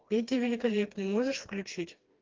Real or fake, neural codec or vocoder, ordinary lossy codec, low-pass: fake; codec, 16 kHz, 2 kbps, FreqCodec, smaller model; Opus, 32 kbps; 7.2 kHz